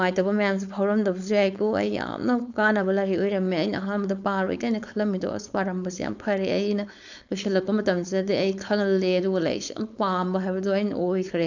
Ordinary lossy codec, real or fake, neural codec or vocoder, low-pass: none; fake; codec, 16 kHz, 4.8 kbps, FACodec; 7.2 kHz